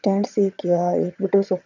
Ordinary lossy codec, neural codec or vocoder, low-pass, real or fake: none; vocoder, 22.05 kHz, 80 mel bands, HiFi-GAN; 7.2 kHz; fake